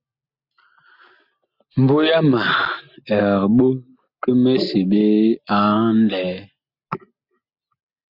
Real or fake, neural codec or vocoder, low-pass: real; none; 5.4 kHz